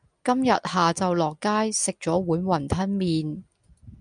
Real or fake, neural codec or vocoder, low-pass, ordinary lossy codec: real; none; 9.9 kHz; MP3, 96 kbps